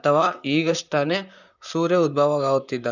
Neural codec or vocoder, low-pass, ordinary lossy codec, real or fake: vocoder, 44.1 kHz, 128 mel bands, Pupu-Vocoder; 7.2 kHz; none; fake